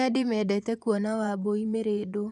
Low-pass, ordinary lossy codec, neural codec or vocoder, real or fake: none; none; vocoder, 24 kHz, 100 mel bands, Vocos; fake